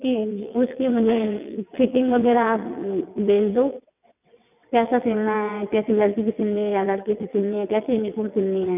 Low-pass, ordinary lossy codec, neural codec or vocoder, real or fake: 3.6 kHz; none; vocoder, 22.05 kHz, 80 mel bands, WaveNeXt; fake